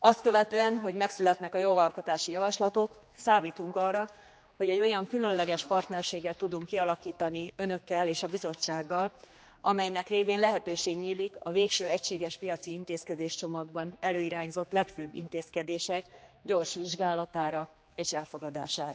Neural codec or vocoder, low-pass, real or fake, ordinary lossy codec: codec, 16 kHz, 2 kbps, X-Codec, HuBERT features, trained on general audio; none; fake; none